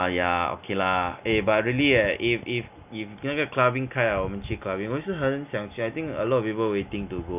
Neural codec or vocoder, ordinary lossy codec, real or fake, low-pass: none; none; real; 3.6 kHz